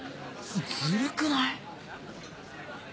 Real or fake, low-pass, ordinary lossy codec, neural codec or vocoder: real; none; none; none